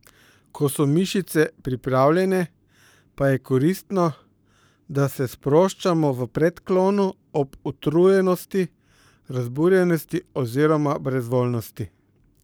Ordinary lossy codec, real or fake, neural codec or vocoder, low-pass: none; fake; codec, 44.1 kHz, 7.8 kbps, Pupu-Codec; none